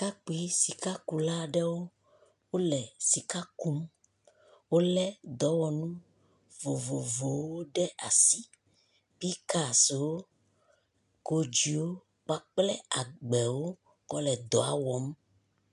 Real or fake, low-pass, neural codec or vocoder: real; 10.8 kHz; none